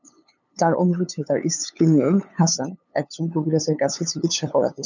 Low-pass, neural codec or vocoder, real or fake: 7.2 kHz; codec, 16 kHz, 8 kbps, FunCodec, trained on LibriTTS, 25 frames a second; fake